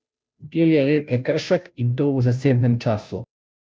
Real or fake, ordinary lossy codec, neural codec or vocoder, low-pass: fake; none; codec, 16 kHz, 0.5 kbps, FunCodec, trained on Chinese and English, 25 frames a second; none